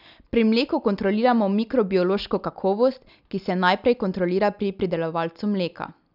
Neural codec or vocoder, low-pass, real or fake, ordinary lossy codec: none; 5.4 kHz; real; none